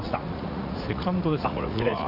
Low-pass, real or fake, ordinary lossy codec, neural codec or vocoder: 5.4 kHz; fake; none; vocoder, 44.1 kHz, 80 mel bands, Vocos